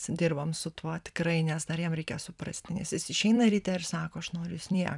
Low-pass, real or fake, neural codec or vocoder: 10.8 kHz; fake; vocoder, 44.1 kHz, 128 mel bands every 256 samples, BigVGAN v2